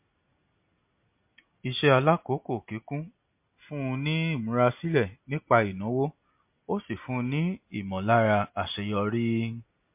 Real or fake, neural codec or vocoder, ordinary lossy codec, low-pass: real; none; MP3, 32 kbps; 3.6 kHz